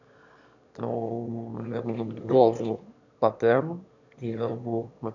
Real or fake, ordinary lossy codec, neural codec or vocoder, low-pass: fake; none; autoencoder, 22.05 kHz, a latent of 192 numbers a frame, VITS, trained on one speaker; 7.2 kHz